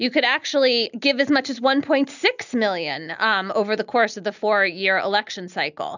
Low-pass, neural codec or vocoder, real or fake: 7.2 kHz; none; real